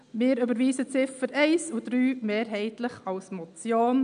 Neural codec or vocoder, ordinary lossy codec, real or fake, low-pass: none; none; real; 9.9 kHz